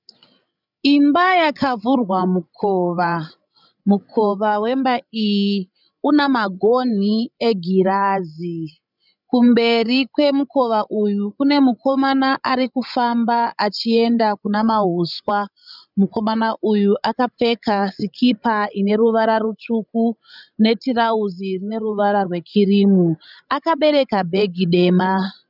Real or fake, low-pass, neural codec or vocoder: fake; 5.4 kHz; codec, 16 kHz, 16 kbps, FreqCodec, larger model